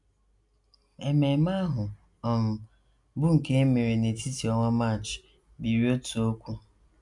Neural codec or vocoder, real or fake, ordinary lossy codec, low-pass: none; real; none; 10.8 kHz